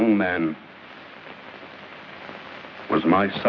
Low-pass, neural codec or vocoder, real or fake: 7.2 kHz; none; real